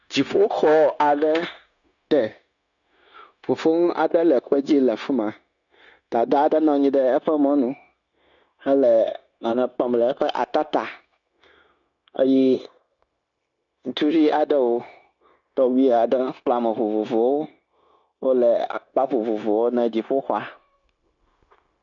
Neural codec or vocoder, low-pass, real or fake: codec, 16 kHz, 0.9 kbps, LongCat-Audio-Codec; 7.2 kHz; fake